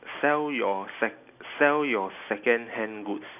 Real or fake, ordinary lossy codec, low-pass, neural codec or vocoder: real; none; 3.6 kHz; none